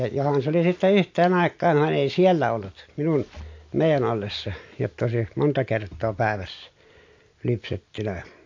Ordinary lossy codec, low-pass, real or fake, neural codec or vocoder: MP3, 48 kbps; 7.2 kHz; real; none